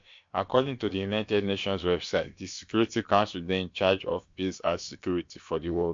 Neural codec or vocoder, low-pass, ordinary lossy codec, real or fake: codec, 16 kHz, about 1 kbps, DyCAST, with the encoder's durations; 7.2 kHz; MP3, 48 kbps; fake